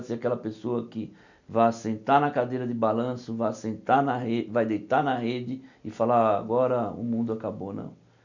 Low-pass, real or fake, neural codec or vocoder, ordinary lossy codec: 7.2 kHz; real; none; none